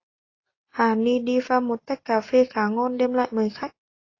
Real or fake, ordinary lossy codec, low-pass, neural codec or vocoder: real; AAC, 32 kbps; 7.2 kHz; none